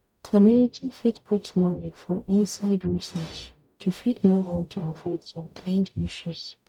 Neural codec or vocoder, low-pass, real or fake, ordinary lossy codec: codec, 44.1 kHz, 0.9 kbps, DAC; 19.8 kHz; fake; none